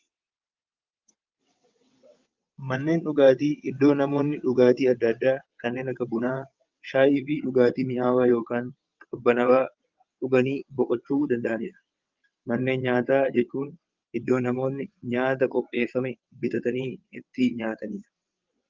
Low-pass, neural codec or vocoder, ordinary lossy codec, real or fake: 7.2 kHz; vocoder, 22.05 kHz, 80 mel bands, WaveNeXt; Opus, 32 kbps; fake